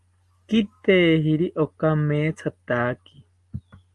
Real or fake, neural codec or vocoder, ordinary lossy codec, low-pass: real; none; Opus, 32 kbps; 10.8 kHz